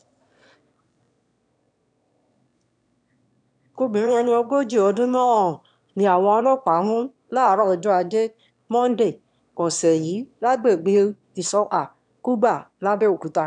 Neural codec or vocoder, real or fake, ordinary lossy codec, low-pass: autoencoder, 22.05 kHz, a latent of 192 numbers a frame, VITS, trained on one speaker; fake; none; 9.9 kHz